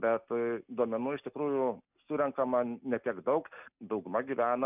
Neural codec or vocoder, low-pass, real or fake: none; 3.6 kHz; real